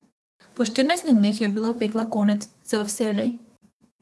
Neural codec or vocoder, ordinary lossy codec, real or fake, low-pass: codec, 24 kHz, 1 kbps, SNAC; none; fake; none